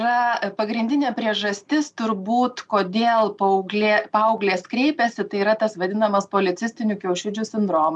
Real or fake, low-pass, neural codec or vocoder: real; 10.8 kHz; none